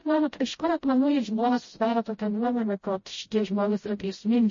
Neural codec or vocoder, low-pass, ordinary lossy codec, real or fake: codec, 16 kHz, 0.5 kbps, FreqCodec, smaller model; 7.2 kHz; MP3, 32 kbps; fake